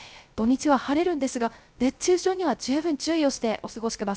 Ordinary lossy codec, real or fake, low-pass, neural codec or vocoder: none; fake; none; codec, 16 kHz, 0.3 kbps, FocalCodec